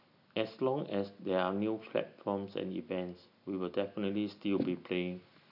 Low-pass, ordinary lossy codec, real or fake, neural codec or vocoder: 5.4 kHz; none; real; none